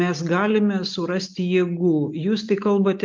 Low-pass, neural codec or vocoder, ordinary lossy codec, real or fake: 7.2 kHz; none; Opus, 32 kbps; real